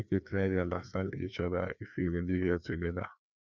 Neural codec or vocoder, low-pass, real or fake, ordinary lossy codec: codec, 16 kHz, 2 kbps, FreqCodec, larger model; 7.2 kHz; fake; none